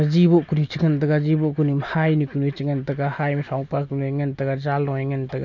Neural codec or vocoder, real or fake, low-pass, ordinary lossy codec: none; real; 7.2 kHz; none